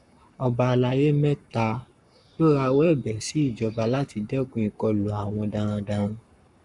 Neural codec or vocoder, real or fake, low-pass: codec, 44.1 kHz, 7.8 kbps, Pupu-Codec; fake; 10.8 kHz